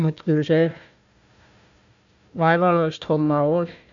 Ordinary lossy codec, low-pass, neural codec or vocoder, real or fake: AAC, 64 kbps; 7.2 kHz; codec, 16 kHz, 1 kbps, FunCodec, trained on Chinese and English, 50 frames a second; fake